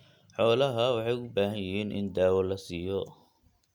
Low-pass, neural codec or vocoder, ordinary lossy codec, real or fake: 19.8 kHz; none; none; real